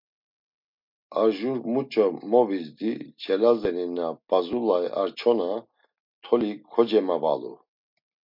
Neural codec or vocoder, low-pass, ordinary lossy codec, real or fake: none; 5.4 kHz; MP3, 48 kbps; real